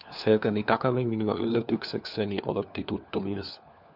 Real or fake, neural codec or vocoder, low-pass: fake; codec, 16 kHz, 4 kbps, FunCodec, trained on LibriTTS, 50 frames a second; 5.4 kHz